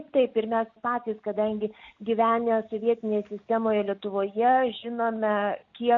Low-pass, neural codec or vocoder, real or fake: 7.2 kHz; none; real